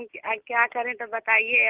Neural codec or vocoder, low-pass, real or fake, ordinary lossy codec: none; 3.6 kHz; real; Opus, 16 kbps